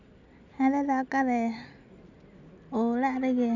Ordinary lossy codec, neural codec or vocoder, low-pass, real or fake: none; none; 7.2 kHz; real